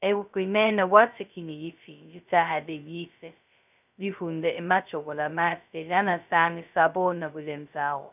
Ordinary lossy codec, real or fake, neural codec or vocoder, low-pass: none; fake; codec, 16 kHz, 0.2 kbps, FocalCodec; 3.6 kHz